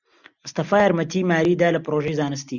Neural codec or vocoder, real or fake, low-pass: none; real; 7.2 kHz